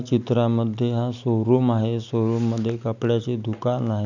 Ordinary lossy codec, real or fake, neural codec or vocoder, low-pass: none; real; none; 7.2 kHz